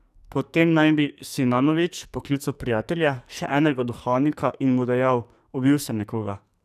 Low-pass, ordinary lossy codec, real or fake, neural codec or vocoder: 14.4 kHz; none; fake; codec, 44.1 kHz, 2.6 kbps, SNAC